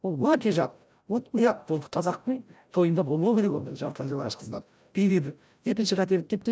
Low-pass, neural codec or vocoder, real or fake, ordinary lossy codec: none; codec, 16 kHz, 0.5 kbps, FreqCodec, larger model; fake; none